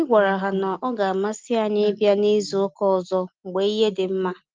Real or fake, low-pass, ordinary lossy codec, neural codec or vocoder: real; 7.2 kHz; Opus, 32 kbps; none